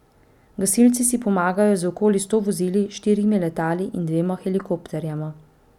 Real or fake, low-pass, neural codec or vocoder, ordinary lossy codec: real; 19.8 kHz; none; none